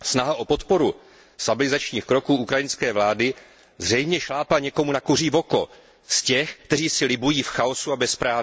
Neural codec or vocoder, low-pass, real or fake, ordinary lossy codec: none; none; real; none